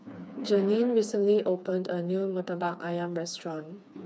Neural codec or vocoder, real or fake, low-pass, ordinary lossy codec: codec, 16 kHz, 4 kbps, FreqCodec, smaller model; fake; none; none